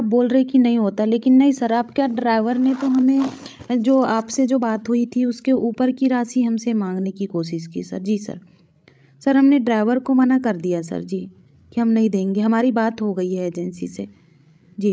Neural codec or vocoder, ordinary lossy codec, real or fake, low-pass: codec, 16 kHz, 16 kbps, FreqCodec, larger model; none; fake; none